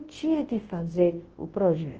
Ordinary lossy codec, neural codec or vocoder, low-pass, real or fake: Opus, 16 kbps; codec, 24 kHz, 0.9 kbps, WavTokenizer, large speech release; 7.2 kHz; fake